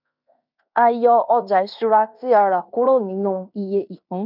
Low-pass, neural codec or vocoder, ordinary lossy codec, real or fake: 5.4 kHz; codec, 16 kHz in and 24 kHz out, 0.9 kbps, LongCat-Audio-Codec, fine tuned four codebook decoder; none; fake